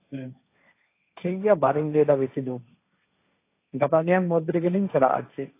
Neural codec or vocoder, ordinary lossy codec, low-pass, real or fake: codec, 16 kHz, 1.1 kbps, Voila-Tokenizer; AAC, 24 kbps; 3.6 kHz; fake